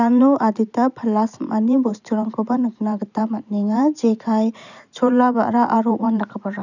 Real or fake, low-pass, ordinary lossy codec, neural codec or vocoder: fake; 7.2 kHz; none; vocoder, 44.1 kHz, 128 mel bands every 512 samples, BigVGAN v2